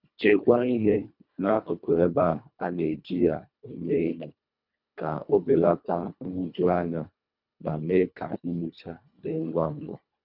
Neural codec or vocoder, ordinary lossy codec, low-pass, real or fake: codec, 24 kHz, 1.5 kbps, HILCodec; none; 5.4 kHz; fake